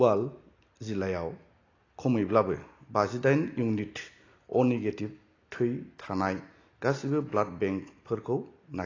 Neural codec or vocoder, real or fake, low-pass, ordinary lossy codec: none; real; 7.2 kHz; AAC, 32 kbps